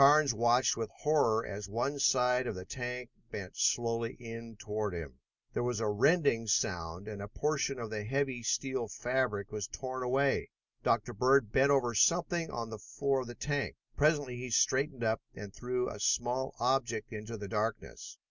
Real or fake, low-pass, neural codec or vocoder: real; 7.2 kHz; none